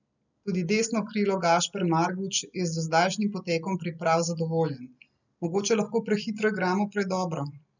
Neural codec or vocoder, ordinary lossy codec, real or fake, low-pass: none; none; real; 7.2 kHz